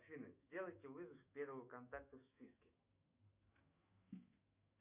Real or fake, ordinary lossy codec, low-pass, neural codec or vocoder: fake; Opus, 64 kbps; 3.6 kHz; autoencoder, 48 kHz, 128 numbers a frame, DAC-VAE, trained on Japanese speech